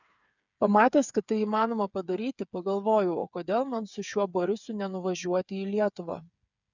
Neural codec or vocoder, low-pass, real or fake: codec, 16 kHz, 8 kbps, FreqCodec, smaller model; 7.2 kHz; fake